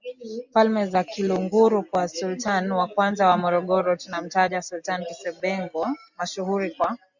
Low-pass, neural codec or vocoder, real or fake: 7.2 kHz; none; real